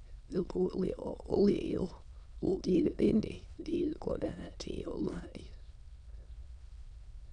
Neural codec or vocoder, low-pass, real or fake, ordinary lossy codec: autoencoder, 22.05 kHz, a latent of 192 numbers a frame, VITS, trained on many speakers; 9.9 kHz; fake; none